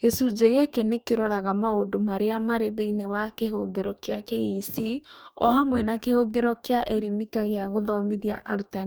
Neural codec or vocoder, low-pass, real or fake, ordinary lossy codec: codec, 44.1 kHz, 2.6 kbps, DAC; none; fake; none